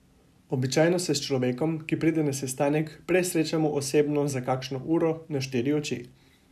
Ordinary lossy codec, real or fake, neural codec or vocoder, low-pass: none; real; none; 14.4 kHz